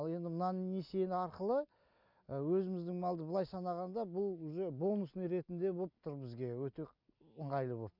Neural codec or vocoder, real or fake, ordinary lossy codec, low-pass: none; real; none; 5.4 kHz